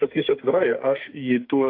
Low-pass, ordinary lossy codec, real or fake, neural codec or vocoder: 5.4 kHz; AAC, 32 kbps; fake; codec, 44.1 kHz, 2.6 kbps, SNAC